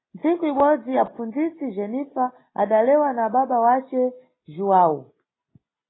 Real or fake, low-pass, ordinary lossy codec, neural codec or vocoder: real; 7.2 kHz; AAC, 16 kbps; none